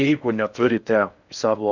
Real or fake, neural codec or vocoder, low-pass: fake; codec, 16 kHz in and 24 kHz out, 0.6 kbps, FocalCodec, streaming, 4096 codes; 7.2 kHz